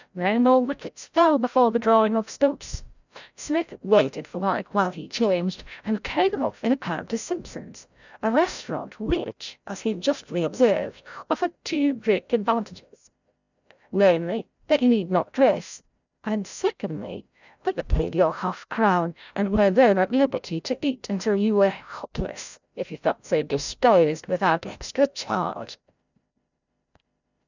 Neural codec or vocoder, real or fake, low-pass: codec, 16 kHz, 0.5 kbps, FreqCodec, larger model; fake; 7.2 kHz